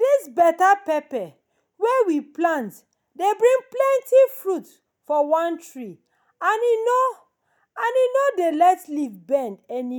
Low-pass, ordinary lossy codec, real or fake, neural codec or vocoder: none; none; real; none